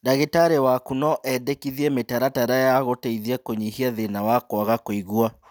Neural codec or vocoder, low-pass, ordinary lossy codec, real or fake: none; none; none; real